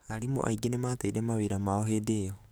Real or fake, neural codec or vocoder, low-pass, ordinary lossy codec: fake; codec, 44.1 kHz, 7.8 kbps, DAC; none; none